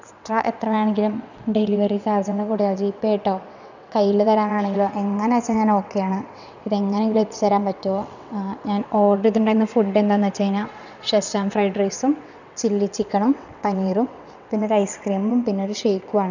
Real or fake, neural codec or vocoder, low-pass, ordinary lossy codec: fake; vocoder, 22.05 kHz, 80 mel bands, WaveNeXt; 7.2 kHz; none